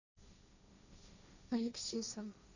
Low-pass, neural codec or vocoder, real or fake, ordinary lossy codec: 7.2 kHz; codec, 16 kHz, 1.1 kbps, Voila-Tokenizer; fake; none